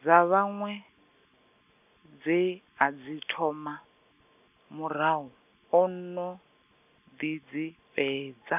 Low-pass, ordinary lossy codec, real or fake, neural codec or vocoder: 3.6 kHz; none; real; none